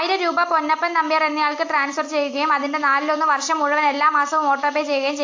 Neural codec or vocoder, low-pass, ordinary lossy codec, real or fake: none; 7.2 kHz; AAC, 48 kbps; real